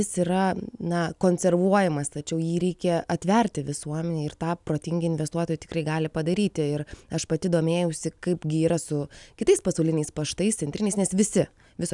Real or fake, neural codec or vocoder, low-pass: real; none; 10.8 kHz